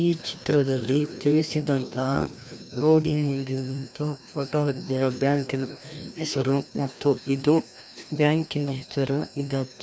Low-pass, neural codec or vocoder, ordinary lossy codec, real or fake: none; codec, 16 kHz, 1 kbps, FreqCodec, larger model; none; fake